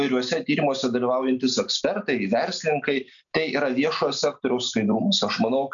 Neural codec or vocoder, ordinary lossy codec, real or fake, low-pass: none; MP3, 96 kbps; real; 7.2 kHz